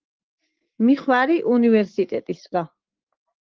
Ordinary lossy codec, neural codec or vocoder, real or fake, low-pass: Opus, 16 kbps; autoencoder, 48 kHz, 128 numbers a frame, DAC-VAE, trained on Japanese speech; fake; 7.2 kHz